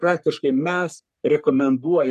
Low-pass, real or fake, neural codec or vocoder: 14.4 kHz; fake; codec, 44.1 kHz, 3.4 kbps, Pupu-Codec